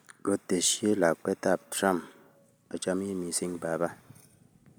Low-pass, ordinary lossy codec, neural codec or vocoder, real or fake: none; none; none; real